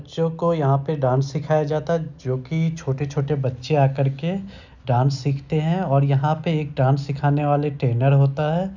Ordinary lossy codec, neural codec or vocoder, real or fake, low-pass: none; none; real; 7.2 kHz